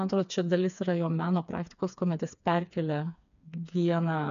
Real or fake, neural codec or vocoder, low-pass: fake; codec, 16 kHz, 4 kbps, FreqCodec, smaller model; 7.2 kHz